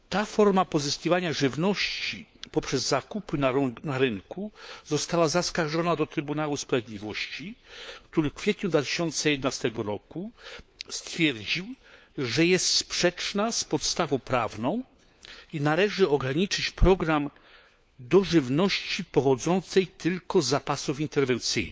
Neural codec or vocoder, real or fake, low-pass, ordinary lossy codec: codec, 16 kHz, 4 kbps, FunCodec, trained on LibriTTS, 50 frames a second; fake; none; none